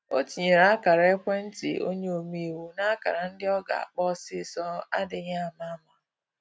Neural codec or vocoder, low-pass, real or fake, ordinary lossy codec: none; none; real; none